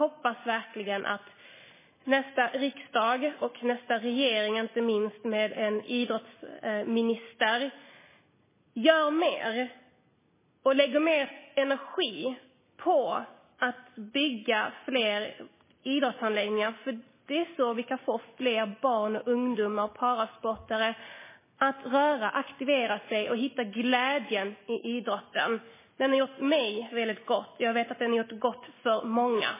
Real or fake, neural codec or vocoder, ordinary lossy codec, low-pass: real; none; MP3, 16 kbps; 3.6 kHz